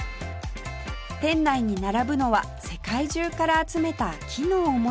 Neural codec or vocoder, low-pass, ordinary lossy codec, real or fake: none; none; none; real